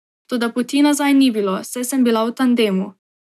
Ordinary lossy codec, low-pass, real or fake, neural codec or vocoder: none; 14.4 kHz; real; none